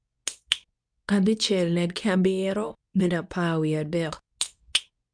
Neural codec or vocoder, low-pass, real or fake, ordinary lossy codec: codec, 24 kHz, 0.9 kbps, WavTokenizer, medium speech release version 2; 9.9 kHz; fake; none